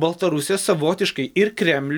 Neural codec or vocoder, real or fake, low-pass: none; real; 19.8 kHz